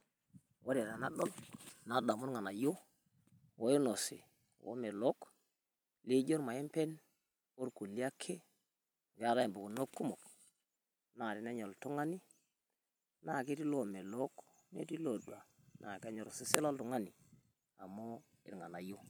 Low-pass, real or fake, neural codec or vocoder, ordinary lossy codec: none; real; none; none